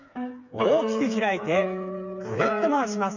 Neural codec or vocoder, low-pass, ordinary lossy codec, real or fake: codec, 16 kHz, 4 kbps, FreqCodec, smaller model; 7.2 kHz; none; fake